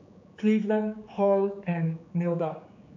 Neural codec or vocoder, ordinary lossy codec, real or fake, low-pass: codec, 16 kHz, 4 kbps, X-Codec, HuBERT features, trained on general audio; AAC, 48 kbps; fake; 7.2 kHz